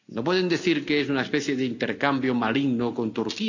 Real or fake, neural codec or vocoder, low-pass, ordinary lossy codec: real; none; 7.2 kHz; none